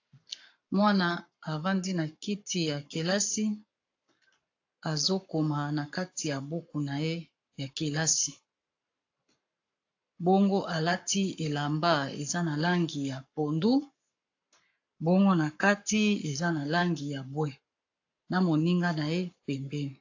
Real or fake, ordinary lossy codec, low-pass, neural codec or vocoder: fake; AAC, 48 kbps; 7.2 kHz; codec, 44.1 kHz, 7.8 kbps, Pupu-Codec